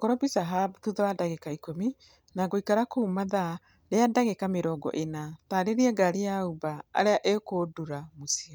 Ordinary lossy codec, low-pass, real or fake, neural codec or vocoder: none; none; real; none